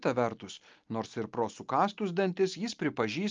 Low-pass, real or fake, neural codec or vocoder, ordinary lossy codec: 7.2 kHz; real; none; Opus, 24 kbps